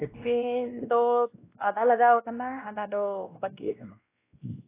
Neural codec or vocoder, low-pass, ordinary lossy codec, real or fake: codec, 16 kHz, 1 kbps, X-Codec, WavLM features, trained on Multilingual LibriSpeech; 3.6 kHz; AAC, 24 kbps; fake